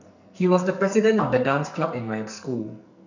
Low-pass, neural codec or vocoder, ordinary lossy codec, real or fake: 7.2 kHz; codec, 44.1 kHz, 2.6 kbps, SNAC; none; fake